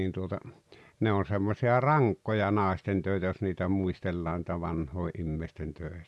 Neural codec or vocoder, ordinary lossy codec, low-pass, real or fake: none; none; none; real